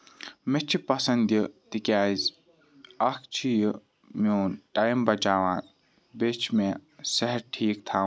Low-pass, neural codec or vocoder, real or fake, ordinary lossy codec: none; none; real; none